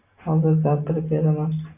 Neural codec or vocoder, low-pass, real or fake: none; 3.6 kHz; real